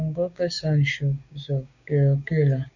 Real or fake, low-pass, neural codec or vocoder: fake; 7.2 kHz; codec, 16 kHz, 6 kbps, DAC